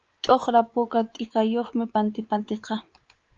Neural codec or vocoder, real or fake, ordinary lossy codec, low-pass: codec, 16 kHz, 4 kbps, X-Codec, WavLM features, trained on Multilingual LibriSpeech; fake; Opus, 24 kbps; 7.2 kHz